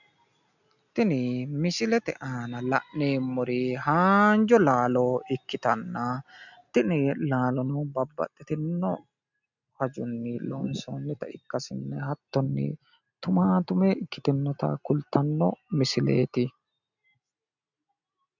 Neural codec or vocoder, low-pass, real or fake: none; 7.2 kHz; real